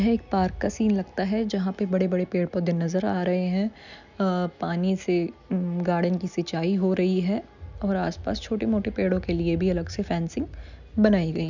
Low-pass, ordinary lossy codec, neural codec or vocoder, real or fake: 7.2 kHz; none; none; real